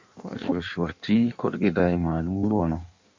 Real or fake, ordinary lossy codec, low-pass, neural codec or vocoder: fake; MP3, 64 kbps; 7.2 kHz; codec, 16 kHz in and 24 kHz out, 1.1 kbps, FireRedTTS-2 codec